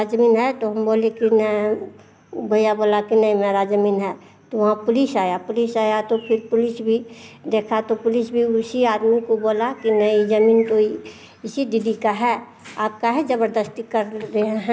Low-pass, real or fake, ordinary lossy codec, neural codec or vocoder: none; real; none; none